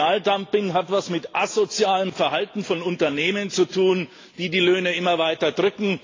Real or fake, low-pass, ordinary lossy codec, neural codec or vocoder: real; 7.2 kHz; AAC, 32 kbps; none